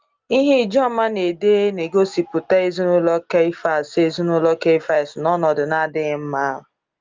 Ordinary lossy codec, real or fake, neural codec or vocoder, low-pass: Opus, 32 kbps; real; none; 7.2 kHz